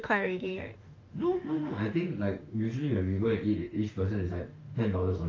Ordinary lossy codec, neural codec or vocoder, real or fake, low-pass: Opus, 24 kbps; autoencoder, 48 kHz, 32 numbers a frame, DAC-VAE, trained on Japanese speech; fake; 7.2 kHz